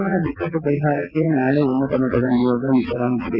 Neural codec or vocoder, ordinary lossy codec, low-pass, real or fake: vocoder, 22.05 kHz, 80 mel bands, WaveNeXt; none; 5.4 kHz; fake